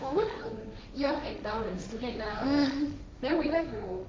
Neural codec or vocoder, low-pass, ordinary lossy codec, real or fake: codec, 16 kHz, 1.1 kbps, Voila-Tokenizer; none; none; fake